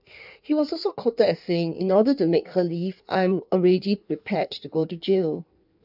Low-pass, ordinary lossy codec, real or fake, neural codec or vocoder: 5.4 kHz; none; fake; codec, 16 kHz in and 24 kHz out, 1.1 kbps, FireRedTTS-2 codec